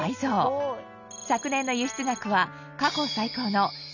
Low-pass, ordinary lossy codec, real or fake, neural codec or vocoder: 7.2 kHz; none; real; none